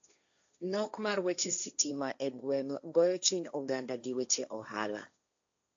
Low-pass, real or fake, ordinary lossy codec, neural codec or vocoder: 7.2 kHz; fake; AAC, 64 kbps; codec, 16 kHz, 1.1 kbps, Voila-Tokenizer